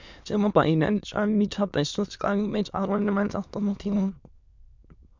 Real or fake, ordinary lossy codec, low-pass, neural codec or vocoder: fake; MP3, 64 kbps; 7.2 kHz; autoencoder, 22.05 kHz, a latent of 192 numbers a frame, VITS, trained on many speakers